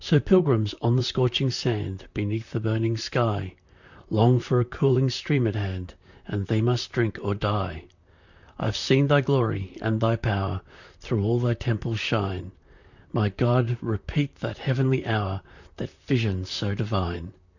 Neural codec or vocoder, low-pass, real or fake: vocoder, 44.1 kHz, 128 mel bands, Pupu-Vocoder; 7.2 kHz; fake